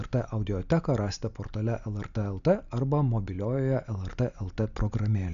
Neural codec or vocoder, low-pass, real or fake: none; 7.2 kHz; real